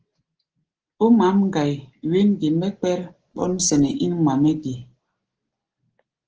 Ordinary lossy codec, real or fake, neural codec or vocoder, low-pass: Opus, 16 kbps; real; none; 7.2 kHz